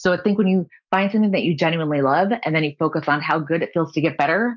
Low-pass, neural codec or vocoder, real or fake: 7.2 kHz; none; real